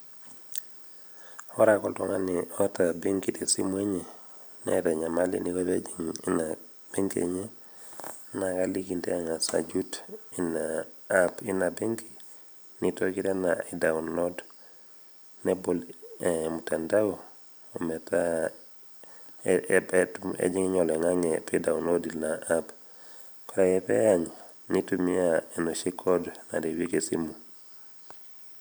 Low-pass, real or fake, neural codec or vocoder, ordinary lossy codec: none; fake; vocoder, 44.1 kHz, 128 mel bands every 512 samples, BigVGAN v2; none